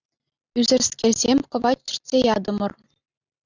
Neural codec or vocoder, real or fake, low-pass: none; real; 7.2 kHz